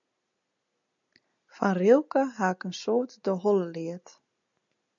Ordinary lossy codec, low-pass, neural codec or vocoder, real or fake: MP3, 64 kbps; 7.2 kHz; none; real